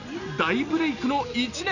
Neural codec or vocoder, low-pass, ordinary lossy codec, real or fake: none; 7.2 kHz; none; real